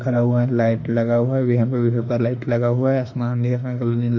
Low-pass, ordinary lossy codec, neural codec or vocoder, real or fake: 7.2 kHz; AAC, 48 kbps; autoencoder, 48 kHz, 32 numbers a frame, DAC-VAE, trained on Japanese speech; fake